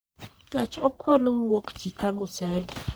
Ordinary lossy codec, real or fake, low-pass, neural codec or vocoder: none; fake; none; codec, 44.1 kHz, 1.7 kbps, Pupu-Codec